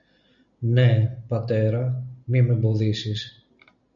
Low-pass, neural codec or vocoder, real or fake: 7.2 kHz; none; real